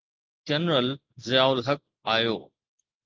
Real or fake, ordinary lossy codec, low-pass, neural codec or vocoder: real; Opus, 24 kbps; 7.2 kHz; none